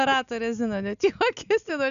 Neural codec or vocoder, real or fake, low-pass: none; real; 7.2 kHz